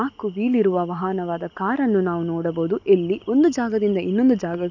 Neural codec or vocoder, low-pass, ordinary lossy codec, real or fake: none; 7.2 kHz; none; real